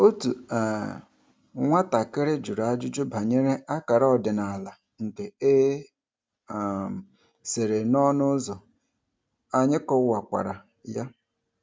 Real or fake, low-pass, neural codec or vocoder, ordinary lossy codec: real; none; none; none